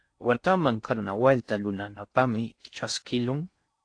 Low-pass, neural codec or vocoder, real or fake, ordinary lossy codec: 9.9 kHz; codec, 16 kHz in and 24 kHz out, 0.6 kbps, FocalCodec, streaming, 4096 codes; fake; AAC, 48 kbps